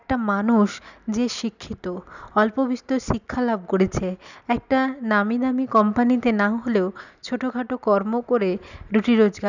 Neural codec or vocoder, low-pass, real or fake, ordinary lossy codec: none; 7.2 kHz; real; none